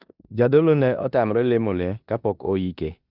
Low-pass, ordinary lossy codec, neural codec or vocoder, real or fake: 5.4 kHz; none; codec, 16 kHz in and 24 kHz out, 0.9 kbps, LongCat-Audio-Codec, four codebook decoder; fake